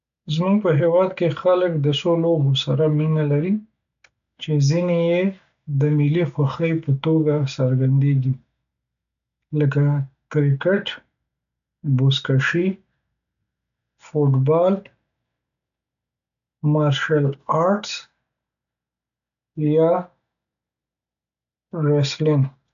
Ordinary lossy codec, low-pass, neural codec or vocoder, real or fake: none; 7.2 kHz; none; real